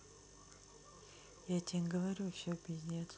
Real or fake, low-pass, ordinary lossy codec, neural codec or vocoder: real; none; none; none